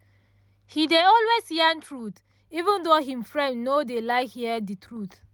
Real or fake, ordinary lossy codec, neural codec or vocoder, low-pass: real; none; none; none